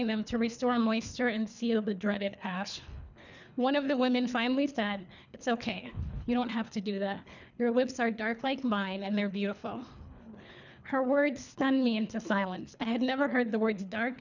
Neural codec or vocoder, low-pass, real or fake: codec, 24 kHz, 3 kbps, HILCodec; 7.2 kHz; fake